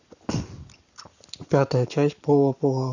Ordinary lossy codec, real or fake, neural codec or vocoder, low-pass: none; fake; codec, 44.1 kHz, 7.8 kbps, Pupu-Codec; 7.2 kHz